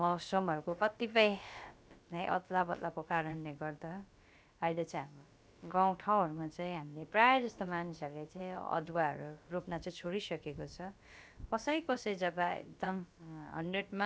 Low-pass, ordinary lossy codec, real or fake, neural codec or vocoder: none; none; fake; codec, 16 kHz, about 1 kbps, DyCAST, with the encoder's durations